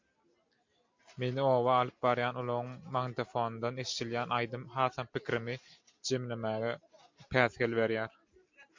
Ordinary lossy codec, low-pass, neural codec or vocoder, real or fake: MP3, 64 kbps; 7.2 kHz; none; real